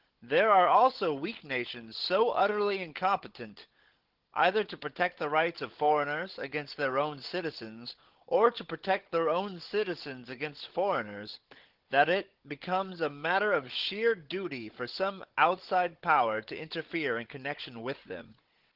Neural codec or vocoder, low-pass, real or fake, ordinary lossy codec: codec, 16 kHz, 16 kbps, FunCodec, trained on Chinese and English, 50 frames a second; 5.4 kHz; fake; Opus, 16 kbps